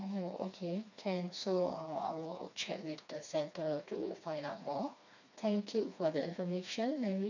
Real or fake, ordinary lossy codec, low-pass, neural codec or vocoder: fake; none; 7.2 kHz; codec, 16 kHz, 2 kbps, FreqCodec, smaller model